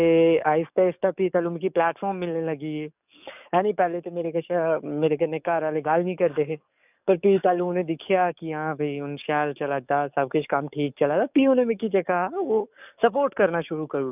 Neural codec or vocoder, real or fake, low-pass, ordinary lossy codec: codec, 44.1 kHz, 7.8 kbps, DAC; fake; 3.6 kHz; none